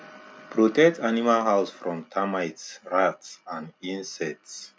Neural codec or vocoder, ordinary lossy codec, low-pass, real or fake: none; none; none; real